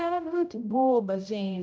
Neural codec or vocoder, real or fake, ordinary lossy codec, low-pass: codec, 16 kHz, 0.5 kbps, X-Codec, HuBERT features, trained on general audio; fake; none; none